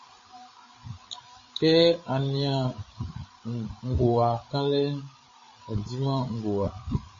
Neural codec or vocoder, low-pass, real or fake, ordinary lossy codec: codec, 16 kHz, 8 kbps, FreqCodec, larger model; 7.2 kHz; fake; MP3, 32 kbps